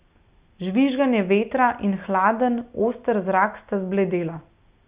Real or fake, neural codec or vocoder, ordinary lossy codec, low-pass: real; none; Opus, 64 kbps; 3.6 kHz